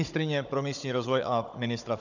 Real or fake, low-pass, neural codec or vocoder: fake; 7.2 kHz; codec, 16 kHz, 16 kbps, FunCodec, trained on Chinese and English, 50 frames a second